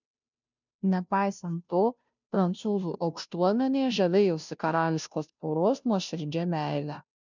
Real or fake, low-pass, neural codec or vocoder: fake; 7.2 kHz; codec, 16 kHz, 0.5 kbps, FunCodec, trained on Chinese and English, 25 frames a second